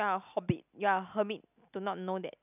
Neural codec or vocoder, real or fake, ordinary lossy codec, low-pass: none; real; none; 3.6 kHz